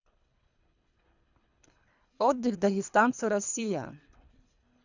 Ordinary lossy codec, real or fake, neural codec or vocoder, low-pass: none; fake; codec, 24 kHz, 3 kbps, HILCodec; 7.2 kHz